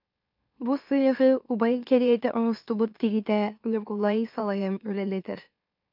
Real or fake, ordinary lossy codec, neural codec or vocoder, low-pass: fake; MP3, 48 kbps; autoencoder, 44.1 kHz, a latent of 192 numbers a frame, MeloTTS; 5.4 kHz